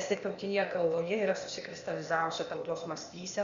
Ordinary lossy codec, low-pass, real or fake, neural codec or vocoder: Opus, 64 kbps; 7.2 kHz; fake; codec, 16 kHz, 0.8 kbps, ZipCodec